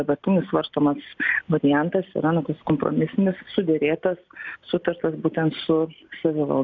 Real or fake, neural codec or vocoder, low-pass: real; none; 7.2 kHz